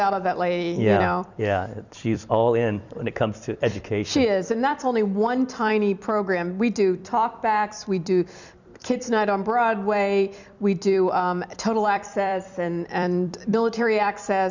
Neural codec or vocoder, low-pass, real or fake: vocoder, 44.1 kHz, 128 mel bands every 256 samples, BigVGAN v2; 7.2 kHz; fake